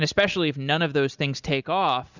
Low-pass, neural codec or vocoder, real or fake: 7.2 kHz; none; real